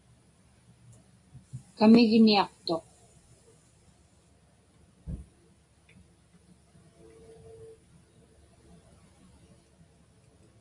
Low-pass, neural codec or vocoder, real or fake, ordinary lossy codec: 10.8 kHz; none; real; AAC, 48 kbps